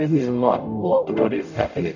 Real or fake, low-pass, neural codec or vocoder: fake; 7.2 kHz; codec, 44.1 kHz, 0.9 kbps, DAC